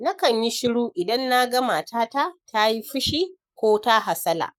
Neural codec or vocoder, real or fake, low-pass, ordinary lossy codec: codec, 44.1 kHz, 7.8 kbps, Pupu-Codec; fake; 19.8 kHz; none